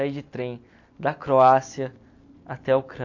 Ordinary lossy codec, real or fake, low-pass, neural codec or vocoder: AAC, 48 kbps; real; 7.2 kHz; none